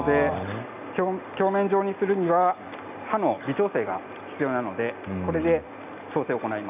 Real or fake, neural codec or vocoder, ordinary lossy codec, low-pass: real; none; none; 3.6 kHz